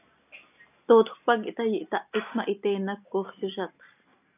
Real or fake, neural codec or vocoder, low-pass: real; none; 3.6 kHz